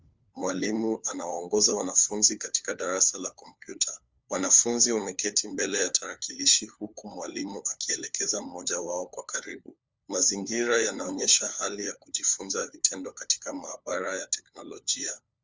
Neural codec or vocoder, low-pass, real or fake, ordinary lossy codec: codec, 16 kHz, 4 kbps, FunCodec, trained on LibriTTS, 50 frames a second; 7.2 kHz; fake; Opus, 32 kbps